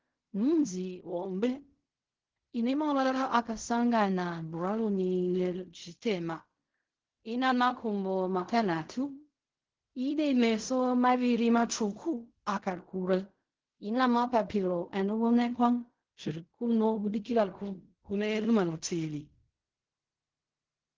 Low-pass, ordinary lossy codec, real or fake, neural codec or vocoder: 7.2 kHz; Opus, 16 kbps; fake; codec, 16 kHz in and 24 kHz out, 0.4 kbps, LongCat-Audio-Codec, fine tuned four codebook decoder